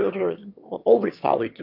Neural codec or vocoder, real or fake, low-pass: autoencoder, 22.05 kHz, a latent of 192 numbers a frame, VITS, trained on one speaker; fake; 5.4 kHz